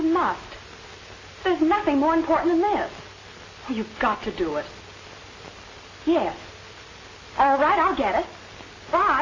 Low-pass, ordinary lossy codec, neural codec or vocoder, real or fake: 7.2 kHz; AAC, 32 kbps; none; real